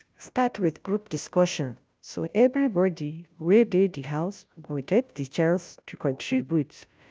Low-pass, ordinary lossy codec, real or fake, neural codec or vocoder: none; none; fake; codec, 16 kHz, 0.5 kbps, FunCodec, trained on Chinese and English, 25 frames a second